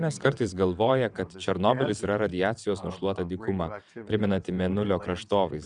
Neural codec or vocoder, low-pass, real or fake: vocoder, 22.05 kHz, 80 mel bands, WaveNeXt; 9.9 kHz; fake